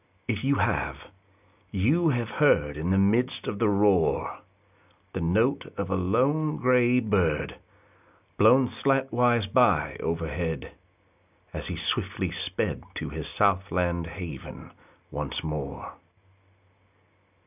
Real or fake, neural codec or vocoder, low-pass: real; none; 3.6 kHz